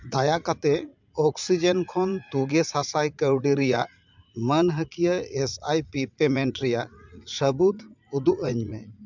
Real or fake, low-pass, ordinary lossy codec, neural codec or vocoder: fake; 7.2 kHz; MP3, 64 kbps; vocoder, 44.1 kHz, 80 mel bands, Vocos